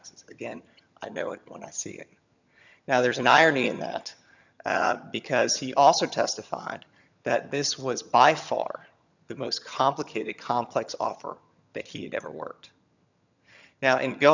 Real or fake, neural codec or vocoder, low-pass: fake; vocoder, 22.05 kHz, 80 mel bands, HiFi-GAN; 7.2 kHz